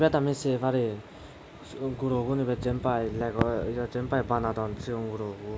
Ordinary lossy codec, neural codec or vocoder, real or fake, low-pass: none; none; real; none